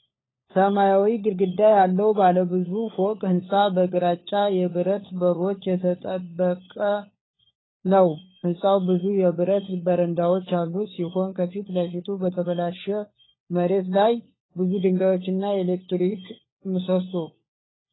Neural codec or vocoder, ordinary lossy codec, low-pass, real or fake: codec, 16 kHz, 4 kbps, FunCodec, trained on LibriTTS, 50 frames a second; AAC, 16 kbps; 7.2 kHz; fake